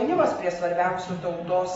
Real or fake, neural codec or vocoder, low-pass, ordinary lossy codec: real; none; 19.8 kHz; AAC, 24 kbps